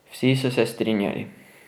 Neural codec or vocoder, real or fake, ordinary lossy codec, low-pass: none; real; none; none